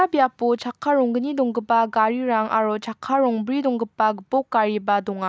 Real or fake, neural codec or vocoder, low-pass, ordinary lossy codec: real; none; none; none